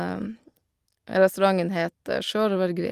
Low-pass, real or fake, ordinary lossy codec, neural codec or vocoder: 14.4 kHz; real; Opus, 24 kbps; none